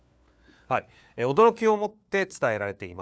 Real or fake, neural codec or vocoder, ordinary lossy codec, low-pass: fake; codec, 16 kHz, 4 kbps, FunCodec, trained on LibriTTS, 50 frames a second; none; none